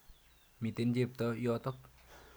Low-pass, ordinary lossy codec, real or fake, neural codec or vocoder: none; none; real; none